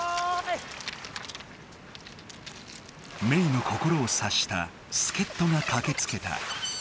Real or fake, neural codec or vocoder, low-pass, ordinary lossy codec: real; none; none; none